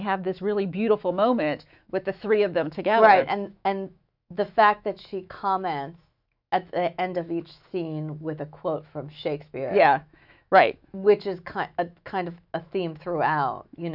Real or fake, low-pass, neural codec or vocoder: fake; 5.4 kHz; autoencoder, 48 kHz, 128 numbers a frame, DAC-VAE, trained on Japanese speech